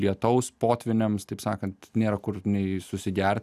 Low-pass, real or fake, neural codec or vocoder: 14.4 kHz; real; none